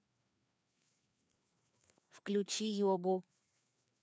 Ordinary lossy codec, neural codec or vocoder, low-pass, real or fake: none; codec, 16 kHz, 2 kbps, FreqCodec, larger model; none; fake